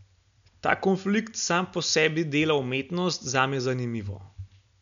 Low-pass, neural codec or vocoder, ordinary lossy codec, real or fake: 7.2 kHz; none; none; real